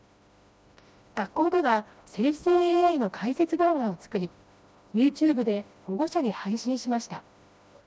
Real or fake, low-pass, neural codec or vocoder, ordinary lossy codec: fake; none; codec, 16 kHz, 1 kbps, FreqCodec, smaller model; none